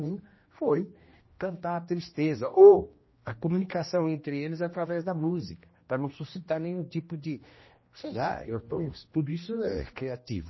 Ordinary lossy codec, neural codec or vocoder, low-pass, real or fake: MP3, 24 kbps; codec, 16 kHz, 1 kbps, X-Codec, HuBERT features, trained on general audio; 7.2 kHz; fake